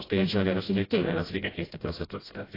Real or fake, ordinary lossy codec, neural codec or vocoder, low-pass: fake; AAC, 24 kbps; codec, 16 kHz, 0.5 kbps, FreqCodec, smaller model; 5.4 kHz